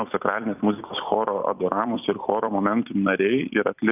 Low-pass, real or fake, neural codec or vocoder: 3.6 kHz; fake; vocoder, 44.1 kHz, 128 mel bands every 256 samples, BigVGAN v2